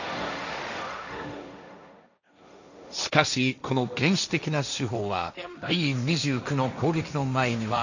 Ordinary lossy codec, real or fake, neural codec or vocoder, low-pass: none; fake; codec, 16 kHz, 1.1 kbps, Voila-Tokenizer; 7.2 kHz